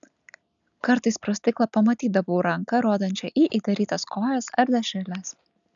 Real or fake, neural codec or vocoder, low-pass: real; none; 7.2 kHz